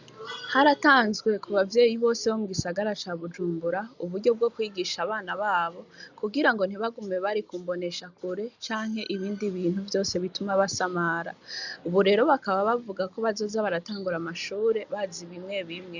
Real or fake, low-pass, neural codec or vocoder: real; 7.2 kHz; none